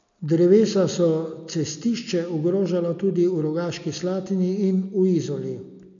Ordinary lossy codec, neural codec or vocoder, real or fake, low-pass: none; none; real; 7.2 kHz